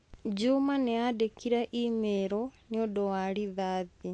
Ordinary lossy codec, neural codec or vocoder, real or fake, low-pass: AAC, 48 kbps; none; real; 10.8 kHz